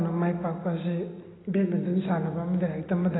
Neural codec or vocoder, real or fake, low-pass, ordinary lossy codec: none; real; 7.2 kHz; AAC, 16 kbps